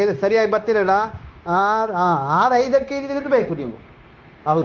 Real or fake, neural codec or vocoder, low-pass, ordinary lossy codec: fake; codec, 16 kHz, 0.9 kbps, LongCat-Audio-Codec; 7.2 kHz; Opus, 32 kbps